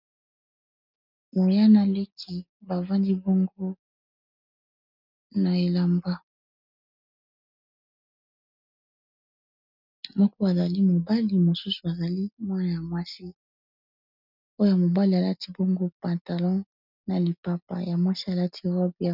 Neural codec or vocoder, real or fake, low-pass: none; real; 5.4 kHz